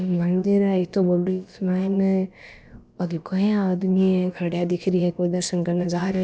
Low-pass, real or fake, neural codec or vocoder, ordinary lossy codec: none; fake; codec, 16 kHz, about 1 kbps, DyCAST, with the encoder's durations; none